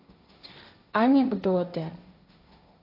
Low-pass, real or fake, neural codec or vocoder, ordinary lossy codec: 5.4 kHz; fake; codec, 16 kHz, 1.1 kbps, Voila-Tokenizer; AAC, 32 kbps